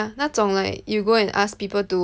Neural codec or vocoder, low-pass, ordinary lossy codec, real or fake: none; none; none; real